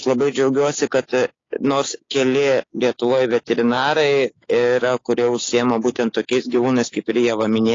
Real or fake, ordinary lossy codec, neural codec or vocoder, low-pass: fake; AAC, 32 kbps; codec, 16 kHz, 6 kbps, DAC; 7.2 kHz